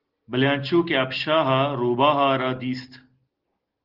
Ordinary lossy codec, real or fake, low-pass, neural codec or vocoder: Opus, 16 kbps; real; 5.4 kHz; none